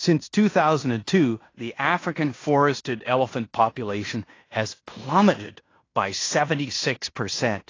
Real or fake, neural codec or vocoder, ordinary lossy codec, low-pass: fake; codec, 16 kHz in and 24 kHz out, 0.9 kbps, LongCat-Audio-Codec, fine tuned four codebook decoder; AAC, 32 kbps; 7.2 kHz